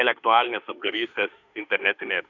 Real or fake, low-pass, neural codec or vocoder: fake; 7.2 kHz; autoencoder, 48 kHz, 32 numbers a frame, DAC-VAE, trained on Japanese speech